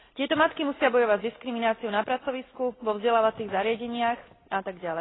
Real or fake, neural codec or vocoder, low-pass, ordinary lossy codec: real; none; 7.2 kHz; AAC, 16 kbps